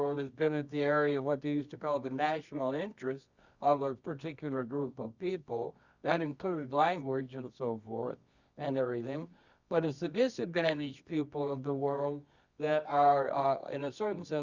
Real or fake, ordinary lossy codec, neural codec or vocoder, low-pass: fake; Opus, 64 kbps; codec, 24 kHz, 0.9 kbps, WavTokenizer, medium music audio release; 7.2 kHz